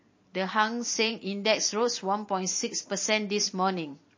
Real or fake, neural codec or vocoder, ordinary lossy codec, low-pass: fake; vocoder, 44.1 kHz, 128 mel bands every 512 samples, BigVGAN v2; MP3, 32 kbps; 7.2 kHz